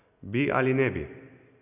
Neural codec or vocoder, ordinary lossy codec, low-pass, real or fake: none; none; 3.6 kHz; real